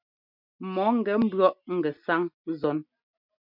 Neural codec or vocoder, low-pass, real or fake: none; 5.4 kHz; real